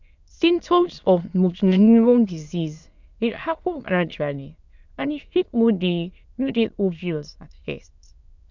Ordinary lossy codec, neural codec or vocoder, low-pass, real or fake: none; autoencoder, 22.05 kHz, a latent of 192 numbers a frame, VITS, trained on many speakers; 7.2 kHz; fake